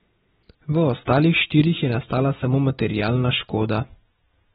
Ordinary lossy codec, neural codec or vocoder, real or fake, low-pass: AAC, 16 kbps; none; real; 10.8 kHz